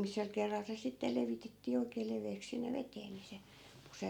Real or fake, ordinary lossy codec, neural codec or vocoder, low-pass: real; none; none; 19.8 kHz